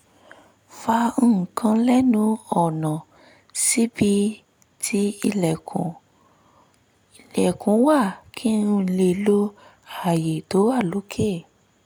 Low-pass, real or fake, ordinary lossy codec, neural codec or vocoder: none; real; none; none